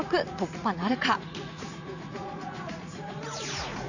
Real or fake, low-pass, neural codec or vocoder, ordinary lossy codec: fake; 7.2 kHz; vocoder, 44.1 kHz, 80 mel bands, Vocos; none